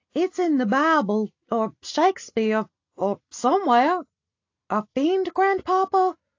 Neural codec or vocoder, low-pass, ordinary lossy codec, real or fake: none; 7.2 kHz; AAC, 48 kbps; real